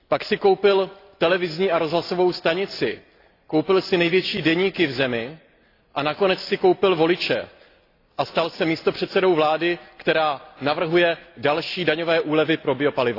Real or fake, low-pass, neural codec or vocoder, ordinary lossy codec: real; 5.4 kHz; none; AAC, 32 kbps